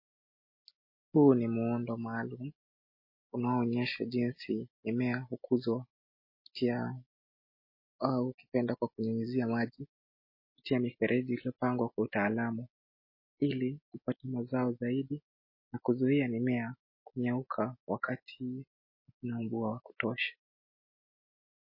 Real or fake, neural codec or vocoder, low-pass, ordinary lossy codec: real; none; 5.4 kHz; MP3, 24 kbps